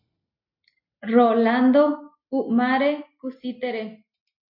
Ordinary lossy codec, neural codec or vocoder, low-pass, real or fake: MP3, 32 kbps; none; 5.4 kHz; real